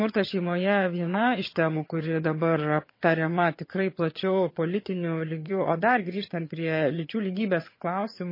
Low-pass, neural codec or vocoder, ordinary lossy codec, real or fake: 5.4 kHz; vocoder, 22.05 kHz, 80 mel bands, HiFi-GAN; MP3, 24 kbps; fake